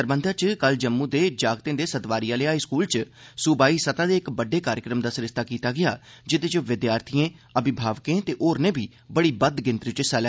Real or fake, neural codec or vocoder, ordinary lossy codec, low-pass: real; none; none; none